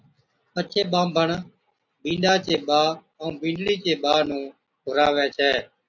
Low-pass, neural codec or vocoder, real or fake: 7.2 kHz; none; real